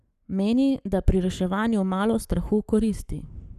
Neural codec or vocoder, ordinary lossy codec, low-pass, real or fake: codec, 44.1 kHz, 7.8 kbps, Pupu-Codec; none; 14.4 kHz; fake